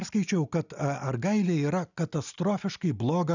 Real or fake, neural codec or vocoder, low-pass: real; none; 7.2 kHz